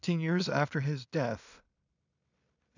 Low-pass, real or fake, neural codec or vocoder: 7.2 kHz; fake; codec, 16 kHz in and 24 kHz out, 0.4 kbps, LongCat-Audio-Codec, two codebook decoder